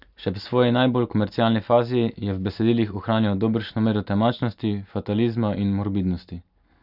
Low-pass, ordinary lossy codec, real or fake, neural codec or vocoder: 5.4 kHz; none; real; none